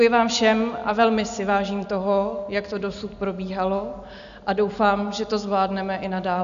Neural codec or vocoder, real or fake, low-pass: none; real; 7.2 kHz